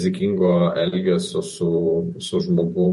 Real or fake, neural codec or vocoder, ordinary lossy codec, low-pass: fake; vocoder, 48 kHz, 128 mel bands, Vocos; MP3, 48 kbps; 14.4 kHz